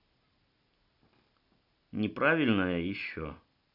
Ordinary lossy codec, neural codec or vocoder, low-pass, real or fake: none; none; 5.4 kHz; real